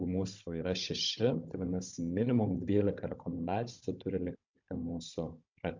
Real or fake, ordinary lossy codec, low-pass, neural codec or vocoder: fake; MP3, 64 kbps; 7.2 kHz; codec, 16 kHz, 16 kbps, FunCodec, trained on Chinese and English, 50 frames a second